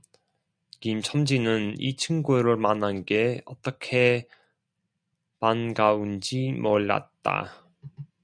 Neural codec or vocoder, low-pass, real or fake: none; 9.9 kHz; real